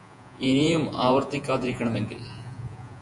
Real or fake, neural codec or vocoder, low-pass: fake; vocoder, 48 kHz, 128 mel bands, Vocos; 10.8 kHz